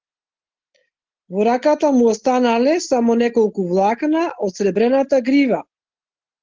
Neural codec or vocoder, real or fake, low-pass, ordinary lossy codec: none; real; 7.2 kHz; Opus, 16 kbps